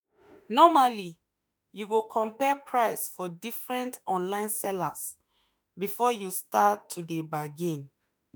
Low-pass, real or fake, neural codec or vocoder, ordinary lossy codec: none; fake; autoencoder, 48 kHz, 32 numbers a frame, DAC-VAE, trained on Japanese speech; none